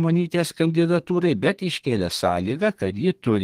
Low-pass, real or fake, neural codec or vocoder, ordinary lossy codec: 14.4 kHz; fake; codec, 32 kHz, 1.9 kbps, SNAC; Opus, 24 kbps